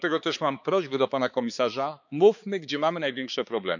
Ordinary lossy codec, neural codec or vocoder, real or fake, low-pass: none; codec, 16 kHz, 4 kbps, X-Codec, HuBERT features, trained on balanced general audio; fake; 7.2 kHz